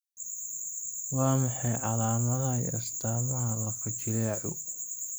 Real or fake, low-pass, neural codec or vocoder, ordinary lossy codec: real; none; none; none